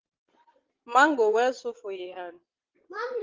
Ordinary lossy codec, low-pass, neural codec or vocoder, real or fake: Opus, 16 kbps; 7.2 kHz; vocoder, 22.05 kHz, 80 mel bands, Vocos; fake